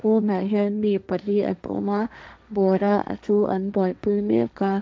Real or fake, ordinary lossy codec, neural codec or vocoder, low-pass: fake; none; codec, 16 kHz, 1.1 kbps, Voila-Tokenizer; none